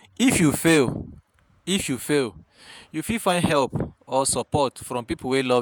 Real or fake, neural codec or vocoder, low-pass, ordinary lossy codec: fake; vocoder, 48 kHz, 128 mel bands, Vocos; none; none